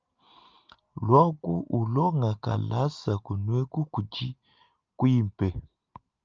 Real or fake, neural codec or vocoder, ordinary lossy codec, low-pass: real; none; Opus, 24 kbps; 7.2 kHz